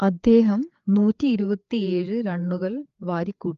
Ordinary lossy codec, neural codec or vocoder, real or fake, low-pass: Opus, 32 kbps; codec, 16 kHz, 4 kbps, FreqCodec, larger model; fake; 7.2 kHz